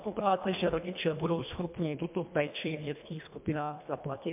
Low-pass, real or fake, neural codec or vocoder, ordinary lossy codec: 3.6 kHz; fake; codec, 24 kHz, 1.5 kbps, HILCodec; MP3, 32 kbps